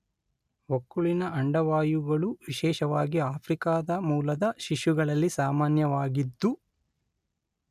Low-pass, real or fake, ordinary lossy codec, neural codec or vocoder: 14.4 kHz; real; none; none